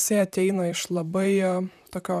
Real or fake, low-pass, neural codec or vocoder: fake; 14.4 kHz; vocoder, 44.1 kHz, 128 mel bands, Pupu-Vocoder